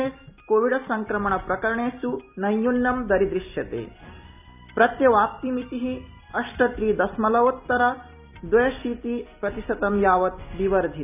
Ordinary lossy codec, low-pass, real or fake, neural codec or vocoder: none; 3.6 kHz; real; none